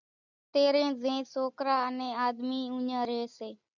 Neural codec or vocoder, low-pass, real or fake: none; 7.2 kHz; real